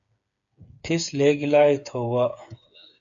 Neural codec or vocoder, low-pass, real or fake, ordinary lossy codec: codec, 16 kHz, 8 kbps, FreqCodec, smaller model; 7.2 kHz; fake; AAC, 64 kbps